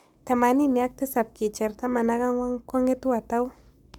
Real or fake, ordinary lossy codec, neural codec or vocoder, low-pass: fake; none; codec, 44.1 kHz, 7.8 kbps, Pupu-Codec; 19.8 kHz